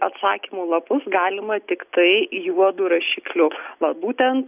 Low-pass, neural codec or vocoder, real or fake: 3.6 kHz; none; real